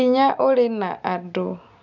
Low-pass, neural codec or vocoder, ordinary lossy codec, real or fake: 7.2 kHz; none; none; real